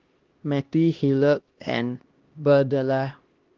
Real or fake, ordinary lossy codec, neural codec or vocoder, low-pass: fake; Opus, 16 kbps; codec, 16 kHz, 1 kbps, X-Codec, HuBERT features, trained on LibriSpeech; 7.2 kHz